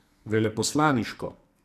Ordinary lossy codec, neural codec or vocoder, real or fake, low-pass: none; codec, 44.1 kHz, 2.6 kbps, SNAC; fake; 14.4 kHz